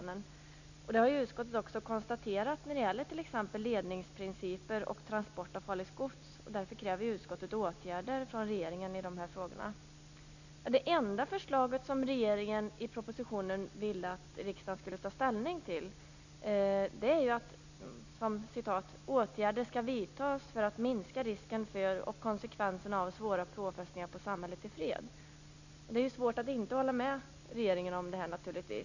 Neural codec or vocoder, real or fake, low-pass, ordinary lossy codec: none; real; 7.2 kHz; none